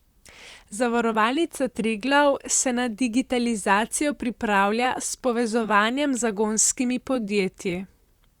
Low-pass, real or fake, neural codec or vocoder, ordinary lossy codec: 19.8 kHz; fake; vocoder, 44.1 kHz, 128 mel bands, Pupu-Vocoder; Opus, 64 kbps